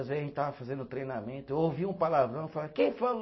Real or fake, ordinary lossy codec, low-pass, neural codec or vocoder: real; MP3, 24 kbps; 7.2 kHz; none